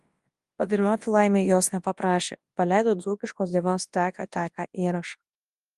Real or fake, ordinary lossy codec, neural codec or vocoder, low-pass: fake; Opus, 24 kbps; codec, 24 kHz, 0.9 kbps, WavTokenizer, large speech release; 10.8 kHz